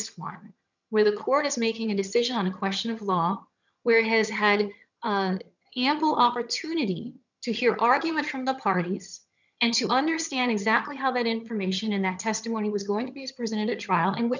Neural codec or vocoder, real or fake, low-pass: vocoder, 22.05 kHz, 80 mel bands, HiFi-GAN; fake; 7.2 kHz